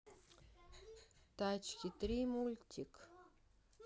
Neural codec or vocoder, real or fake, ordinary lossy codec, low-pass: none; real; none; none